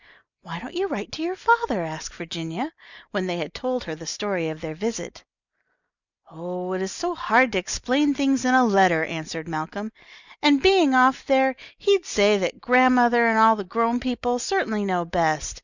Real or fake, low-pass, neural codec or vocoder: real; 7.2 kHz; none